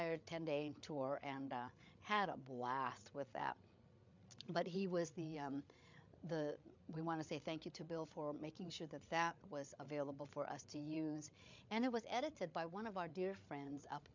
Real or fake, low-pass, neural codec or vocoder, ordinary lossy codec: fake; 7.2 kHz; codec, 16 kHz, 8 kbps, FreqCodec, larger model; MP3, 64 kbps